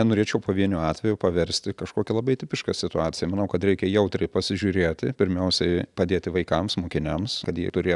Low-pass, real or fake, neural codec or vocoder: 10.8 kHz; real; none